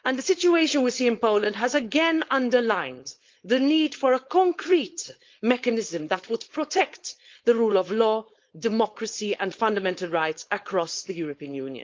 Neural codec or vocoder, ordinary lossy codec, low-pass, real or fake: codec, 16 kHz, 4.8 kbps, FACodec; Opus, 32 kbps; 7.2 kHz; fake